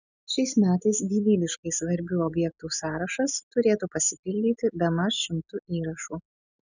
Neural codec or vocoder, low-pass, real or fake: none; 7.2 kHz; real